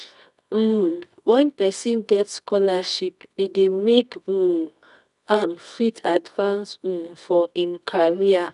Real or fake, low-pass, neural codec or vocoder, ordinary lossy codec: fake; 10.8 kHz; codec, 24 kHz, 0.9 kbps, WavTokenizer, medium music audio release; none